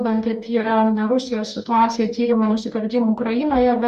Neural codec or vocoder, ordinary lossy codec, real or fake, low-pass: codec, 44.1 kHz, 2.6 kbps, DAC; Opus, 64 kbps; fake; 14.4 kHz